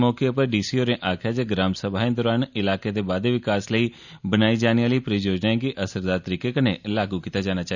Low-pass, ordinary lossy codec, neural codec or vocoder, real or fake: 7.2 kHz; none; none; real